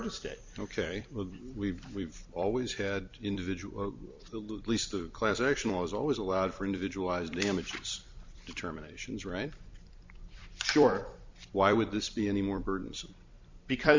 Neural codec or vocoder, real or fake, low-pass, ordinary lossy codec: vocoder, 44.1 kHz, 128 mel bands every 256 samples, BigVGAN v2; fake; 7.2 kHz; MP3, 64 kbps